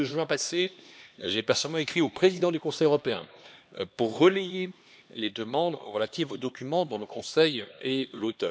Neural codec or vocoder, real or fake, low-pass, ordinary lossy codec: codec, 16 kHz, 2 kbps, X-Codec, HuBERT features, trained on LibriSpeech; fake; none; none